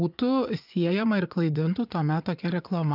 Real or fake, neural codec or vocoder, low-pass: fake; vocoder, 24 kHz, 100 mel bands, Vocos; 5.4 kHz